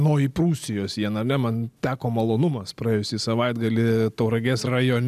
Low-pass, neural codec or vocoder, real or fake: 14.4 kHz; none; real